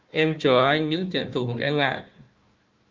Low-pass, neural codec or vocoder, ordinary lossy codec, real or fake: 7.2 kHz; codec, 16 kHz, 1 kbps, FunCodec, trained on Chinese and English, 50 frames a second; Opus, 24 kbps; fake